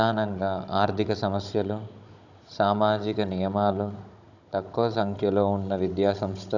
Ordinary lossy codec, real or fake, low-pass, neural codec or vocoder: none; fake; 7.2 kHz; codec, 16 kHz, 16 kbps, FunCodec, trained on Chinese and English, 50 frames a second